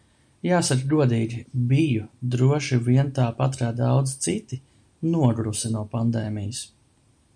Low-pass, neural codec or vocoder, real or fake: 9.9 kHz; none; real